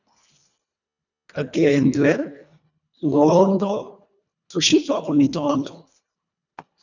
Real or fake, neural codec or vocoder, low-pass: fake; codec, 24 kHz, 1.5 kbps, HILCodec; 7.2 kHz